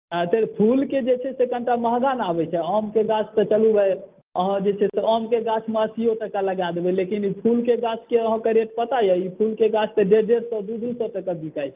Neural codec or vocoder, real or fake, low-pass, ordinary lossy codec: none; real; 3.6 kHz; Opus, 24 kbps